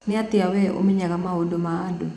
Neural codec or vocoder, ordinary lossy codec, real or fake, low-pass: vocoder, 24 kHz, 100 mel bands, Vocos; none; fake; none